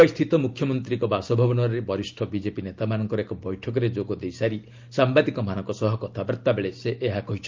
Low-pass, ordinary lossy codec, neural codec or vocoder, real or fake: 7.2 kHz; Opus, 32 kbps; none; real